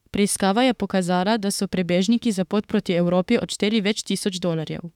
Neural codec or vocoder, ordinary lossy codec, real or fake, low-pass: autoencoder, 48 kHz, 32 numbers a frame, DAC-VAE, trained on Japanese speech; none; fake; 19.8 kHz